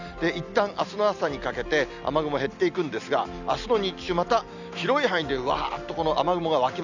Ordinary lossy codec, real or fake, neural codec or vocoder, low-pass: none; real; none; 7.2 kHz